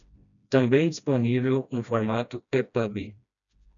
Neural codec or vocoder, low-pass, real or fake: codec, 16 kHz, 1 kbps, FreqCodec, smaller model; 7.2 kHz; fake